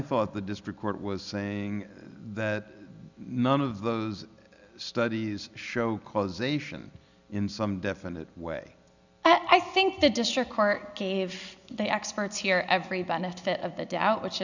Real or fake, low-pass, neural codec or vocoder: real; 7.2 kHz; none